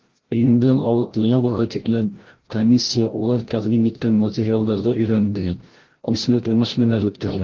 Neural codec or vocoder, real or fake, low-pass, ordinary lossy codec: codec, 16 kHz, 0.5 kbps, FreqCodec, larger model; fake; 7.2 kHz; Opus, 16 kbps